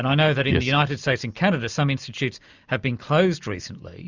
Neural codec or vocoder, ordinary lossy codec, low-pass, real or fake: none; Opus, 64 kbps; 7.2 kHz; real